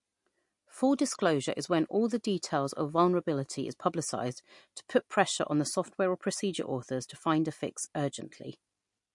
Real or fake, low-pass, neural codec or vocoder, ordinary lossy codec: real; 10.8 kHz; none; MP3, 48 kbps